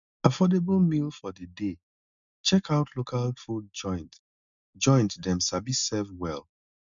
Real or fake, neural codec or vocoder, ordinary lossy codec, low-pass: real; none; none; 7.2 kHz